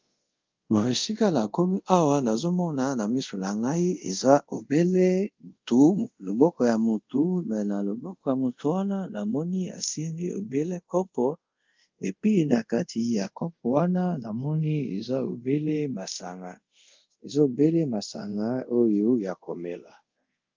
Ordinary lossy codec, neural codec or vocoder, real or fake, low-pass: Opus, 32 kbps; codec, 24 kHz, 0.5 kbps, DualCodec; fake; 7.2 kHz